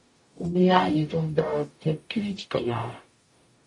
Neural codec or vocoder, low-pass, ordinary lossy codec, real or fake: codec, 44.1 kHz, 0.9 kbps, DAC; 10.8 kHz; MP3, 48 kbps; fake